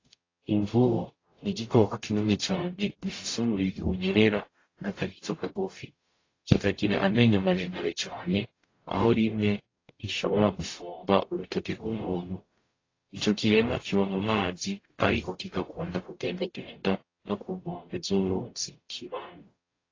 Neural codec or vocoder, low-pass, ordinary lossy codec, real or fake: codec, 44.1 kHz, 0.9 kbps, DAC; 7.2 kHz; AAC, 32 kbps; fake